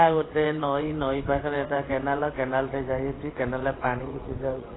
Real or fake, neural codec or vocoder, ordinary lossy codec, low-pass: fake; vocoder, 22.05 kHz, 80 mel bands, WaveNeXt; AAC, 16 kbps; 7.2 kHz